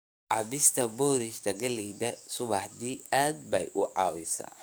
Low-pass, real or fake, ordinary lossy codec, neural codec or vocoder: none; fake; none; codec, 44.1 kHz, 7.8 kbps, DAC